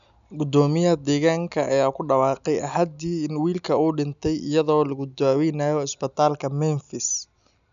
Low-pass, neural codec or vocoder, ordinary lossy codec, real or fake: 7.2 kHz; none; none; real